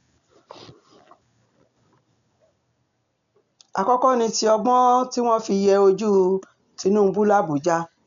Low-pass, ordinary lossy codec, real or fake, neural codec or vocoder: 7.2 kHz; none; real; none